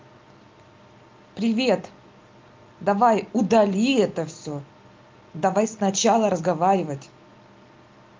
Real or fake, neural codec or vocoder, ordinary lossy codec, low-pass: real; none; Opus, 32 kbps; 7.2 kHz